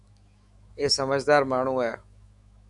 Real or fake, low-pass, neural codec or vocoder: fake; 10.8 kHz; autoencoder, 48 kHz, 128 numbers a frame, DAC-VAE, trained on Japanese speech